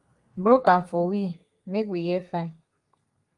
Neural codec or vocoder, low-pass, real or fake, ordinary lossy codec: codec, 32 kHz, 1.9 kbps, SNAC; 10.8 kHz; fake; Opus, 32 kbps